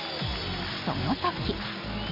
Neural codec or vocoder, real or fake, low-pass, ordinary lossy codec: none; real; 5.4 kHz; MP3, 32 kbps